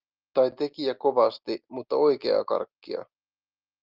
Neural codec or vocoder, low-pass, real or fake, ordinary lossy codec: none; 5.4 kHz; real; Opus, 32 kbps